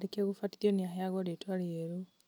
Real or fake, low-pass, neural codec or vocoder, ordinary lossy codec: real; none; none; none